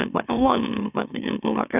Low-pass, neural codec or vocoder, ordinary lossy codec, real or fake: 3.6 kHz; autoencoder, 44.1 kHz, a latent of 192 numbers a frame, MeloTTS; none; fake